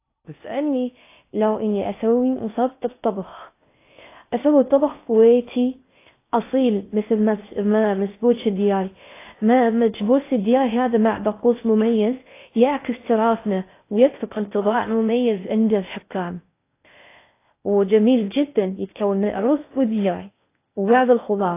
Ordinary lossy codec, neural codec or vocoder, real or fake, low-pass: AAC, 24 kbps; codec, 16 kHz in and 24 kHz out, 0.6 kbps, FocalCodec, streaming, 2048 codes; fake; 3.6 kHz